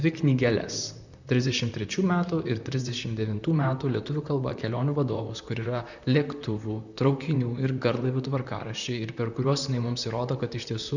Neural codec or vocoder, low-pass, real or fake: vocoder, 44.1 kHz, 128 mel bands, Pupu-Vocoder; 7.2 kHz; fake